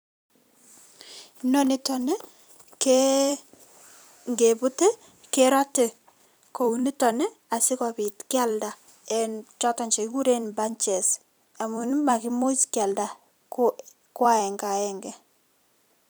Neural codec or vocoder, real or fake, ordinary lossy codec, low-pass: vocoder, 44.1 kHz, 128 mel bands every 256 samples, BigVGAN v2; fake; none; none